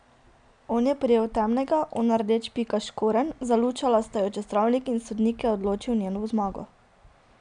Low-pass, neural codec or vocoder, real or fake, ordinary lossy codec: 9.9 kHz; none; real; none